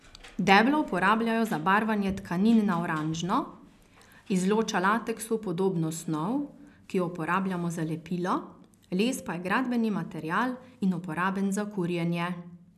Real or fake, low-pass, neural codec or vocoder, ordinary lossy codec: real; 14.4 kHz; none; none